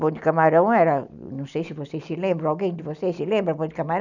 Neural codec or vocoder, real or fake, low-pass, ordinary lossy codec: none; real; 7.2 kHz; none